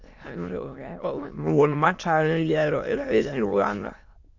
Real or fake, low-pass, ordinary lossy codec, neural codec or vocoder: fake; 7.2 kHz; AAC, 48 kbps; autoencoder, 22.05 kHz, a latent of 192 numbers a frame, VITS, trained on many speakers